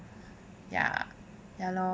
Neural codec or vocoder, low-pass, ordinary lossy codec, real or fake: none; none; none; real